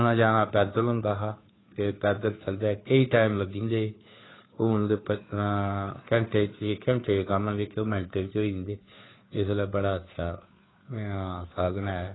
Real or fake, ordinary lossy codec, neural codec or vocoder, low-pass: fake; AAC, 16 kbps; codec, 16 kHz, 2 kbps, FunCodec, trained on Chinese and English, 25 frames a second; 7.2 kHz